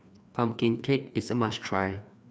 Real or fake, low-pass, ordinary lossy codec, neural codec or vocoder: fake; none; none; codec, 16 kHz, 2 kbps, FreqCodec, larger model